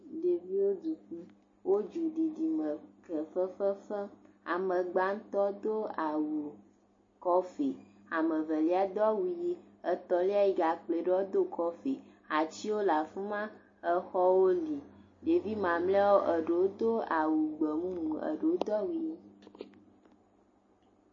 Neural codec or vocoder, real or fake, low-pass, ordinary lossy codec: none; real; 7.2 kHz; MP3, 32 kbps